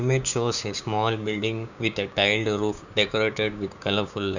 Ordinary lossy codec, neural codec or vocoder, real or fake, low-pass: none; codec, 16 kHz, 6 kbps, DAC; fake; 7.2 kHz